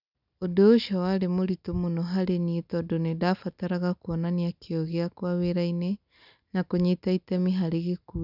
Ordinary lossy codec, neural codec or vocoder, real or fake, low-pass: none; none; real; 5.4 kHz